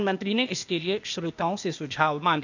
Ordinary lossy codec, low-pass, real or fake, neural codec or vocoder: none; 7.2 kHz; fake; codec, 16 kHz, 0.8 kbps, ZipCodec